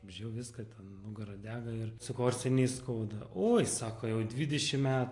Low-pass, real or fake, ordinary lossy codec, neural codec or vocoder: 10.8 kHz; real; AAC, 48 kbps; none